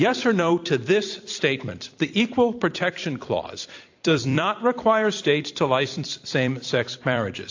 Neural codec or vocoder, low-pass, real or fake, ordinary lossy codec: vocoder, 44.1 kHz, 128 mel bands every 256 samples, BigVGAN v2; 7.2 kHz; fake; AAC, 48 kbps